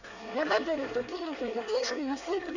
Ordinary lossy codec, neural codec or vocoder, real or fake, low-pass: none; codec, 24 kHz, 1 kbps, SNAC; fake; 7.2 kHz